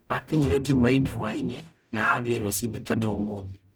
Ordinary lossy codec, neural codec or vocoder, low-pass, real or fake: none; codec, 44.1 kHz, 0.9 kbps, DAC; none; fake